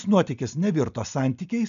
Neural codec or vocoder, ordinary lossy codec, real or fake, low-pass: none; MP3, 96 kbps; real; 7.2 kHz